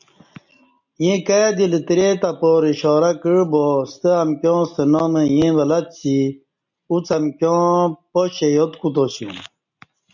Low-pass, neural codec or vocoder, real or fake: 7.2 kHz; none; real